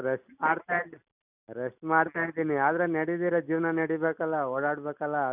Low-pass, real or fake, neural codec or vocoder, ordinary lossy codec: 3.6 kHz; real; none; none